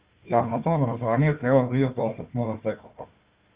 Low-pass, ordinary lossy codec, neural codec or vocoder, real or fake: 3.6 kHz; Opus, 32 kbps; codec, 16 kHz, 1 kbps, FunCodec, trained on Chinese and English, 50 frames a second; fake